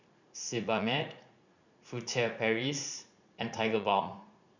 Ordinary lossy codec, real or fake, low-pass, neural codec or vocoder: none; fake; 7.2 kHz; vocoder, 44.1 kHz, 80 mel bands, Vocos